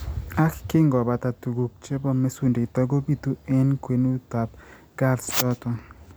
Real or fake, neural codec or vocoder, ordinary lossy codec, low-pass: real; none; none; none